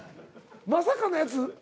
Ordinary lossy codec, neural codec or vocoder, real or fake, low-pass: none; none; real; none